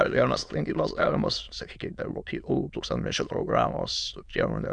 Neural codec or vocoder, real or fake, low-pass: autoencoder, 22.05 kHz, a latent of 192 numbers a frame, VITS, trained on many speakers; fake; 9.9 kHz